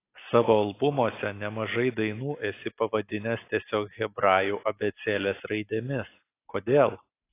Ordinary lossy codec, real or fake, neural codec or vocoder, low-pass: AAC, 24 kbps; real; none; 3.6 kHz